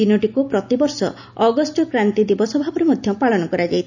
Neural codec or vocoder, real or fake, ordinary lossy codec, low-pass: none; real; none; 7.2 kHz